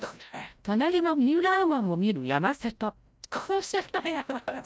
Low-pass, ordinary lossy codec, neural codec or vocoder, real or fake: none; none; codec, 16 kHz, 0.5 kbps, FreqCodec, larger model; fake